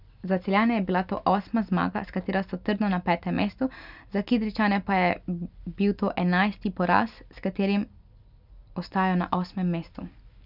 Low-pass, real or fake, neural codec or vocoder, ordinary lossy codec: 5.4 kHz; real; none; none